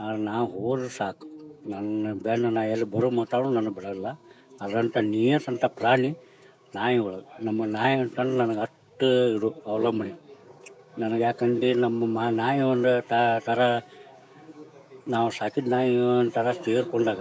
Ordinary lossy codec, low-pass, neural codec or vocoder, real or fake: none; none; codec, 16 kHz, 6 kbps, DAC; fake